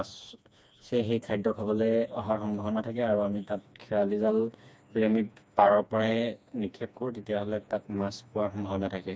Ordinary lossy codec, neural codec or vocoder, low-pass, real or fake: none; codec, 16 kHz, 2 kbps, FreqCodec, smaller model; none; fake